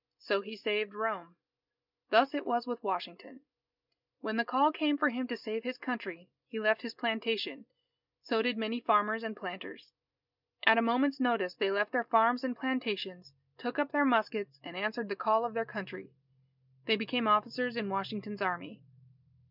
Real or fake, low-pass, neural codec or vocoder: real; 5.4 kHz; none